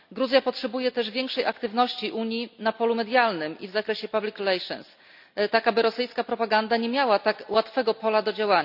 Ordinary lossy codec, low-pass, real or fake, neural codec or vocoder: none; 5.4 kHz; real; none